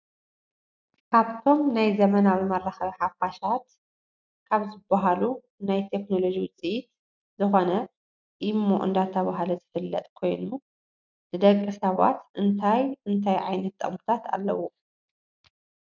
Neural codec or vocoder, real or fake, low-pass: none; real; 7.2 kHz